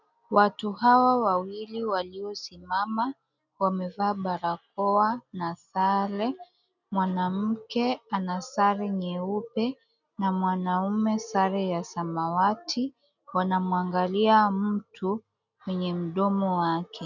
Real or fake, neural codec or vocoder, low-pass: real; none; 7.2 kHz